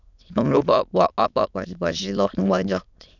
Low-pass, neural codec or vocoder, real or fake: 7.2 kHz; autoencoder, 22.05 kHz, a latent of 192 numbers a frame, VITS, trained on many speakers; fake